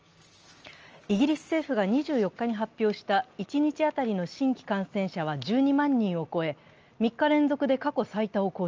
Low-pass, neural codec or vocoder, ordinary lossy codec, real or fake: 7.2 kHz; none; Opus, 24 kbps; real